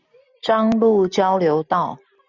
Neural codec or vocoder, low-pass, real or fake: none; 7.2 kHz; real